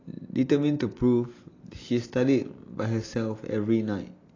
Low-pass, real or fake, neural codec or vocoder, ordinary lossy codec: 7.2 kHz; real; none; AAC, 32 kbps